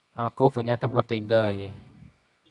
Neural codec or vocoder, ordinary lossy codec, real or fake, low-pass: codec, 24 kHz, 0.9 kbps, WavTokenizer, medium music audio release; MP3, 96 kbps; fake; 10.8 kHz